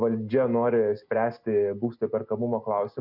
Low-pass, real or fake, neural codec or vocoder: 5.4 kHz; real; none